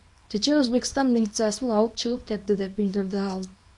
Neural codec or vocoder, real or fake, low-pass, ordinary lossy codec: codec, 24 kHz, 0.9 kbps, WavTokenizer, small release; fake; 10.8 kHz; MP3, 64 kbps